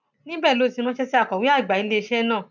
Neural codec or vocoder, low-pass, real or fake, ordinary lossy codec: none; 7.2 kHz; real; none